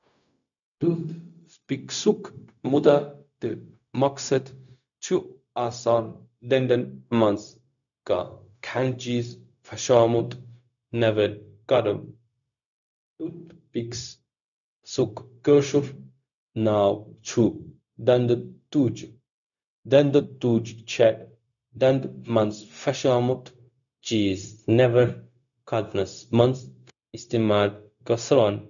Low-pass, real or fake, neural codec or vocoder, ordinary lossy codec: 7.2 kHz; fake; codec, 16 kHz, 0.4 kbps, LongCat-Audio-Codec; none